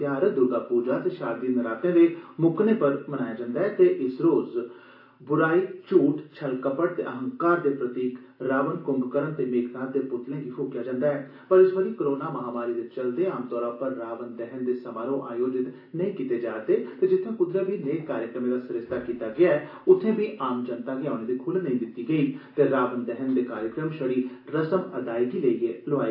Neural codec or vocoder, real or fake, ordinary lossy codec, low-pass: none; real; AAC, 32 kbps; 5.4 kHz